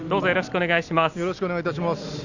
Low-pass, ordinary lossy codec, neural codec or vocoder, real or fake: 7.2 kHz; none; none; real